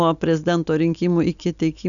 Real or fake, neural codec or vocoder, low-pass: real; none; 7.2 kHz